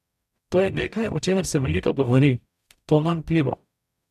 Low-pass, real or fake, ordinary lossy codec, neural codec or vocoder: 14.4 kHz; fake; MP3, 96 kbps; codec, 44.1 kHz, 0.9 kbps, DAC